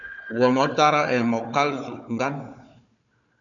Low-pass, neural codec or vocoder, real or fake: 7.2 kHz; codec, 16 kHz, 4 kbps, FunCodec, trained on Chinese and English, 50 frames a second; fake